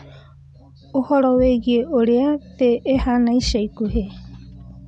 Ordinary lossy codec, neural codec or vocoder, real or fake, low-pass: none; none; real; 10.8 kHz